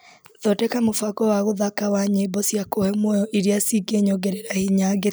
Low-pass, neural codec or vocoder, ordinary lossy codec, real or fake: none; none; none; real